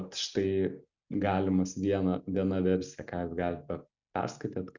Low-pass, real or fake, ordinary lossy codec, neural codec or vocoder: 7.2 kHz; real; Opus, 64 kbps; none